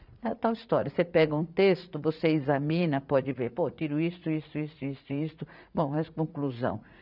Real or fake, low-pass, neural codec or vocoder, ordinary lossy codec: fake; 5.4 kHz; vocoder, 44.1 kHz, 80 mel bands, Vocos; none